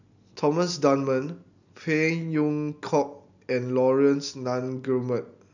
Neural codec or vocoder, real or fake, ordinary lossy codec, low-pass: none; real; none; 7.2 kHz